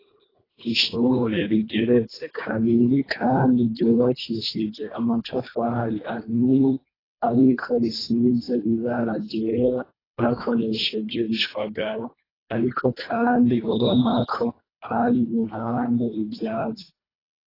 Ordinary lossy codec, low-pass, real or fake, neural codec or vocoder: AAC, 24 kbps; 5.4 kHz; fake; codec, 24 kHz, 1.5 kbps, HILCodec